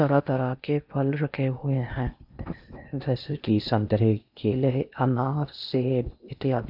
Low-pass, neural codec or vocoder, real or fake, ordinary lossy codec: 5.4 kHz; codec, 16 kHz in and 24 kHz out, 0.8 kbps, FocalCodec, streaming, 65536 codes; fake; none